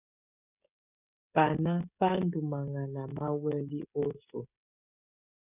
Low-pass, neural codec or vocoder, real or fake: 3.6 kHz; codec, 16 kHz, 16 kbps, FreqCodec, smaller model; fake